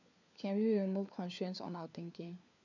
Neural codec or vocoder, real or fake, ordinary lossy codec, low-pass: codec, 16 kHz, 4 kbps, FunCodec, trained on LibriTTS, 50 frames a second; fake; none; 7.2 kHz